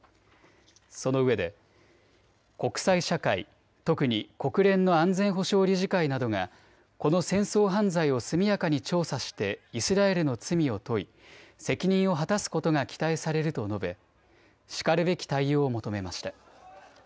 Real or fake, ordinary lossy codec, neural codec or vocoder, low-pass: real; none; none; none